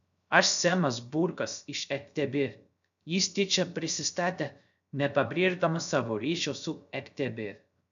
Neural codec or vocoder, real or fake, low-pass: codec, 16 kHz, 0.3 kbps, FocalCodec; fake; 7.2 kHz